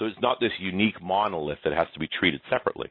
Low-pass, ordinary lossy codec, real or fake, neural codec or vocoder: 5.4 kHz; MP3, 24 kbps; real; none